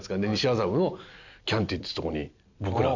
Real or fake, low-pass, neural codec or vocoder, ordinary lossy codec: real; 7.2 kHz; none; none